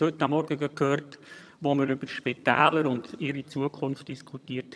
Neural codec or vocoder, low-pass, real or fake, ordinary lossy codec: vocoder, 22.05 kHz, 80 mel bands, HiFi-GAN; none; fake; none